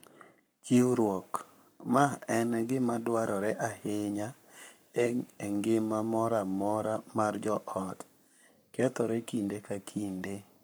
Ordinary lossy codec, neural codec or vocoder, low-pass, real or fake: none; codec, 44.1 kHz, 7.8 kbps, Pupu-Codec; none; fake